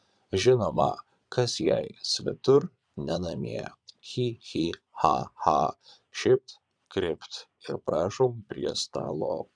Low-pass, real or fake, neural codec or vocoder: 9.9 kHz; fake; vocoder, 22.05 kHz, 80 mel bands, Vocos